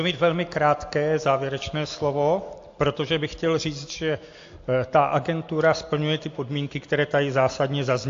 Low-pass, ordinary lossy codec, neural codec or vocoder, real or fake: 7.2 kHz; AAC, 48 kbps; none; real